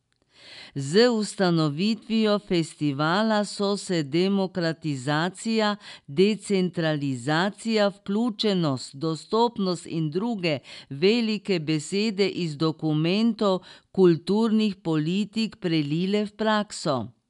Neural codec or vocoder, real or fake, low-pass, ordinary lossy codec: none; real; 10.8 kHz; none